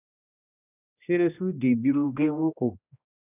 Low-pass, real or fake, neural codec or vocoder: 3.6 kHz; fake; codec, 16 kHz, 1 kbps, X-Codec, HuBERT features, trained on general audio